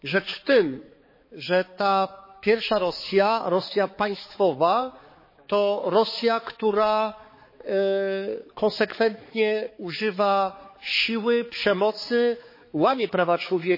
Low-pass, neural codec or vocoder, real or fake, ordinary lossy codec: 5.4 kHz; codec, 16 kHz, 4 kbps, X-Codec, HuBERT features, trained on balanced general audio; fake; MP3, 24 kbps